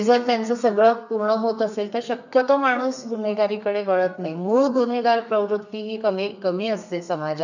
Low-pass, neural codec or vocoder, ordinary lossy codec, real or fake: 7.2 kHz; codec, 32 kHz, 1.9 kbps, SNAC; none; fake